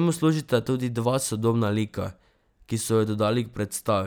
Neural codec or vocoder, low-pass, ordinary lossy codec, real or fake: none; none; none; real